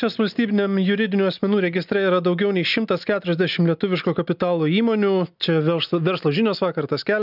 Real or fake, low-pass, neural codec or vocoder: real; 5.4 kHz; none